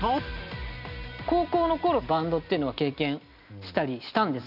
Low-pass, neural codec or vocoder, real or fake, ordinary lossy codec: 5.4 kHz; none; real; none